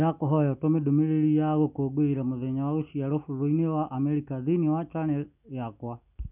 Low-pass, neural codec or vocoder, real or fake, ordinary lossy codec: 3.6 kHz; none; real; none